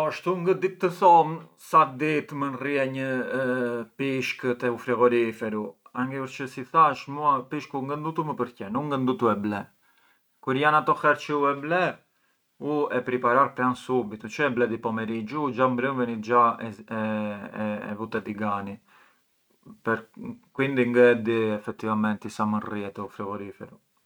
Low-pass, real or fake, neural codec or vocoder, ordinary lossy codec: none; real; none; none